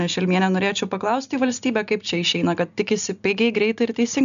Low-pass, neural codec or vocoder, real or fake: 7.2 kHz; none; real